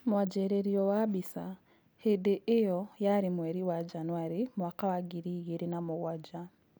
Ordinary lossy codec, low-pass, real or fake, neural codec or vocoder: none; none; real; none